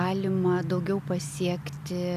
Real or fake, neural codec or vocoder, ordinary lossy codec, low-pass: real; none; AAC, 96 kbps; 14.4 kHz